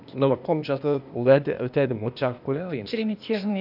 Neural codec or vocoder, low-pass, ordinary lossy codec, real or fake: codec, 16 kHz, 0.8 kbps, ZipCodec; 5.4 kHz; none; fake